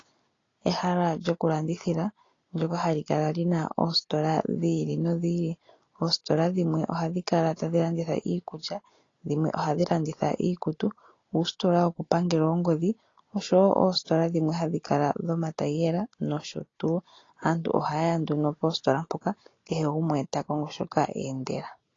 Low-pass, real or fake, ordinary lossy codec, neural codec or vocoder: 7.2 kHz; real; AAC, 32 kbps; none